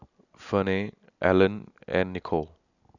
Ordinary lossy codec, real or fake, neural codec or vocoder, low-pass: none; real; none; 7.2 kHz